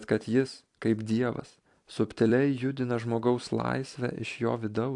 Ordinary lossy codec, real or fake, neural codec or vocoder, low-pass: AAC, 64 kbps; fake; vocoder, 44.1 kHz, 128 mel bands every 256 samples, BigVGAN v2; 10.8 kHz